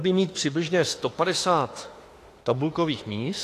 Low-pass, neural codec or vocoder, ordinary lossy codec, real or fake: 14.4 kHz; autoencoder, 48 kHz, 32 numbers a frame, DAC-VAE, trained on Japanese speech; AAC, 48 kbps; fake